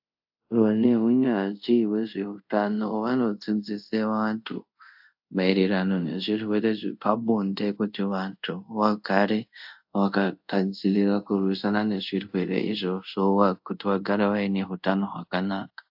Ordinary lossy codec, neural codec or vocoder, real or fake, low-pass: AAC, 48 kbps; codec, 24 kHz, 0.5 kbps, DualCodec; fake; 5.4 kHz